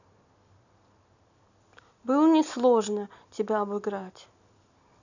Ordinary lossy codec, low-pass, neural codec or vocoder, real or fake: none; 7.2 kHz; none; real